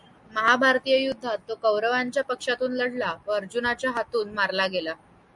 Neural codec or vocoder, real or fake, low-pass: none; real; 10.8 kHz